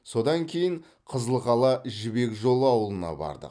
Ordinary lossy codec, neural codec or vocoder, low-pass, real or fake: none; none; 9.9 kHz; real